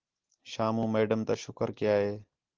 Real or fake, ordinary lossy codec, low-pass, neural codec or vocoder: real; Opus, 16 kbps; 7.2 kHz; none